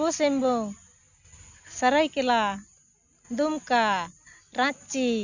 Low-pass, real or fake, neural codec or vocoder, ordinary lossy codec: 7.2 kHz; real; none; none